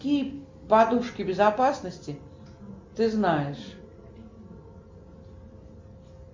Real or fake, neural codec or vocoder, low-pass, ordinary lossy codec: real; none; 7.2 kHz; MP3, 48 kbps